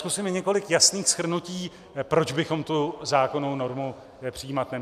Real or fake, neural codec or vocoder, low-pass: real; none; 14.4 kHz